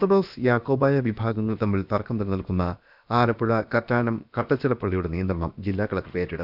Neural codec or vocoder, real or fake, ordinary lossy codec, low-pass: codec, 16 kHz, about 1 kbps, DyCAST, with the encoder's durations; fake; none; 5.4 kHz